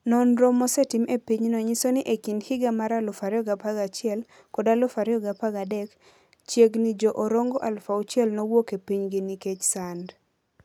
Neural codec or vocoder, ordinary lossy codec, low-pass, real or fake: none; none; 19.8 kHz; real